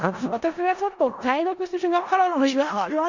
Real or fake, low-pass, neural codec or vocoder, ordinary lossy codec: fake; 7.2 kHz; codec, 16 kHz in and 24 kHz out, 0.4 kbps, LongCat-Audio-Codec, four codebook decoder; Opus, 64 kbps